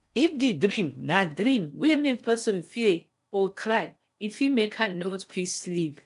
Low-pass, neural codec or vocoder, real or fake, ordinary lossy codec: 10.8 kHz; codec, 16 kHz in and 24 kHz out, 0.6 kbps, FocalCodec, streaming, 4096 codes; fake; MP3, 96 kbps